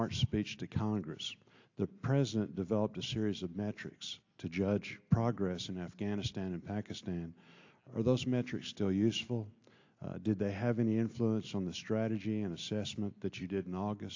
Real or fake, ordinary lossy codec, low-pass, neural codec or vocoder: real; AAC, 48 kbps; 7.2 kHz; none